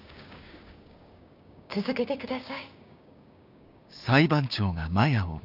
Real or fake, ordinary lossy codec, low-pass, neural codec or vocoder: fake; none; 5.4 kHz; codec, 16 kHz, 6 kbps, DAC